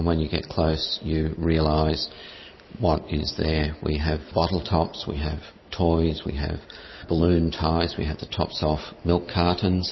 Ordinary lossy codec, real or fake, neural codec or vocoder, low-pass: MP3, 24 kbps; real; none; 7.2 kHz